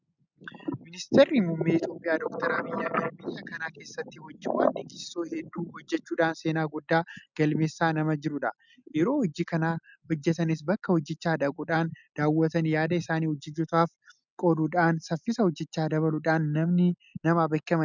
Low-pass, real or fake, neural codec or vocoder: 7.2 kHz; real; none